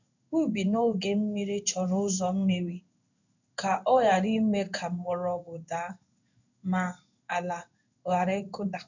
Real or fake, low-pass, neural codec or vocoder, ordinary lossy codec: fake; 7.2 kHz; codec, 16 kHz in and 24 kHz out, 1 kbps, XY-Tokenizer; none